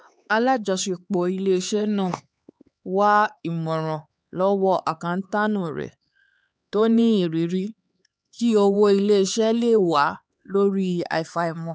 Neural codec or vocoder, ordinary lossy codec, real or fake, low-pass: codec, 16 kHz, 4 kbps, X-Codec, HuBERT features, trained on LibriSpeech; none; fake; none